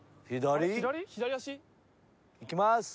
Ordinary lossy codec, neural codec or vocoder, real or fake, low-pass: none; none; real; none